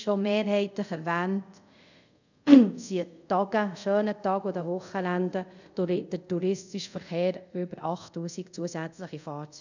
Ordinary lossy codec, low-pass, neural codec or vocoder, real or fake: none; 7.2 kHz; codec, 24 kHz, 0.5 kbps, DualCodec; fake